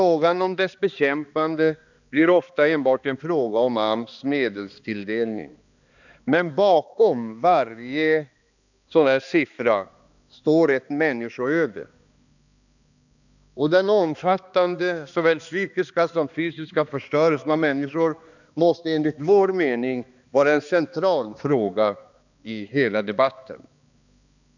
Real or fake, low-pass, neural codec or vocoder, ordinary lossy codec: fake; 7.2 kHz; codec, 16 kHz, 2 kbps, X-Codec, HuBERT features, trained on balanced general audio; none